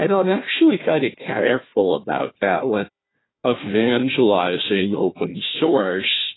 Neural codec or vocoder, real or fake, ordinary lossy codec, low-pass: codec, 16 kHz, 1 kbps, FunCodec, trained on Chinese and English, 50 frames a second; fake; AAC, 16 kbps; 7.2 kHz